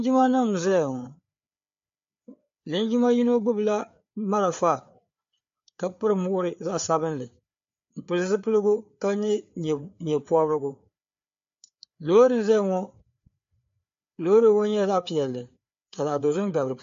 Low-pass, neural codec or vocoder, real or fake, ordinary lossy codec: 7.2 kHz; codec, 16 kHz, 4 kbps, FreqCodec, larger model; fake; MP3, 48 kbps